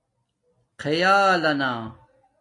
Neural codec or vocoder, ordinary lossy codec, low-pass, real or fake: none; AAC, 48 kbps; 10.8 kHz; real